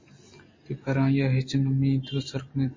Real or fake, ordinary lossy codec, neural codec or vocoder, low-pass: real; MP3, 32 kbps; none; 7.2 kHz